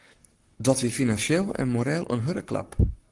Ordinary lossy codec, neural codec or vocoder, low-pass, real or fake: Opus, 24 kbps; codec, 44.1 kHz, 7.8 kbps, Pupu-Codec; 10.8 kHz; fake